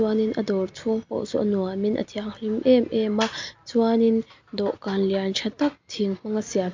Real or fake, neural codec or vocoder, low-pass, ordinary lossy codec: real; none; 7.2 kHz; MP3, 64 kbps